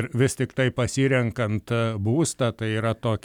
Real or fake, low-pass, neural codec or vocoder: real; 19.8 kHz; none